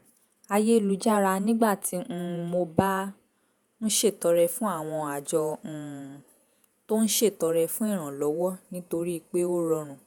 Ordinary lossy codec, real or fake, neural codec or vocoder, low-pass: none; fake; vocoder, 48 kHz, 128 mel bands, Vocos; none